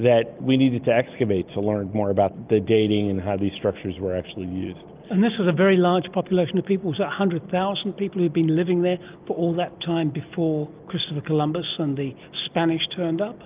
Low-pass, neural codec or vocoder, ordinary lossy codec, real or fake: 3.6 kHz; none; Opus, 24 kbps; real